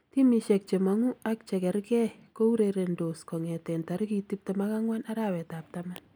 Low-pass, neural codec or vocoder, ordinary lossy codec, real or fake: none; none; none; real